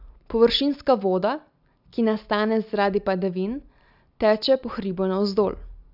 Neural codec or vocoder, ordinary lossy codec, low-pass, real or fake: none; none; 5.4 kHz; real